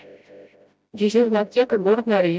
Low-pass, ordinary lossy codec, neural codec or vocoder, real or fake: none; none; codec, 16 kHz, 0.5 kbps, FreqCodec, smaller model; fake